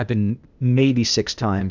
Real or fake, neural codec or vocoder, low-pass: fake; codec, 16 kHz, 0.8 kbps, ZipCodec; 7.2 kHz